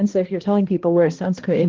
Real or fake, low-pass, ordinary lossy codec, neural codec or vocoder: fake; 7.2 kHz; Opus, 16 kbps; codec, 16 kHz, 1 kbps, X-Codec, HuBERT features, trained on balanced general audio